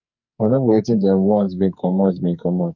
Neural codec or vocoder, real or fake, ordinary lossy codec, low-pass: codec, 44.1 kHz, 2.6 kbps, SNAC; fake; none; 7.2 kHz